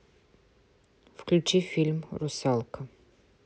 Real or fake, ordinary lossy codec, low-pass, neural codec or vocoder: real; none; none; none